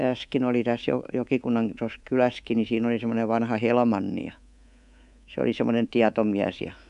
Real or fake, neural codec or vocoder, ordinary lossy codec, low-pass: fake; codec, 24 kHz, 3.1 kbps, DualCodec; none; 10.8 kHz